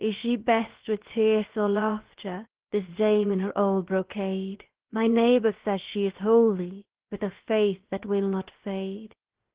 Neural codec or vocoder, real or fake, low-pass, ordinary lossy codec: codec, 16 kHz, 0.7 kbps, FocalCodec; fake; 3.6 kHz; Opus, 16 kbps